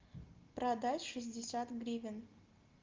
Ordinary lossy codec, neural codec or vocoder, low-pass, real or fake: Opus, 32 kbps; none; 7.2 kHz; real